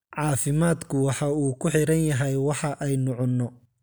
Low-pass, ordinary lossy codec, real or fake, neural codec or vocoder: none; none; real; none